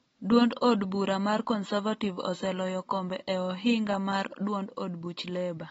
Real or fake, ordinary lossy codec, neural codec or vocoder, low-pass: real; AAC, 24 kbps; none; 14.4 kHz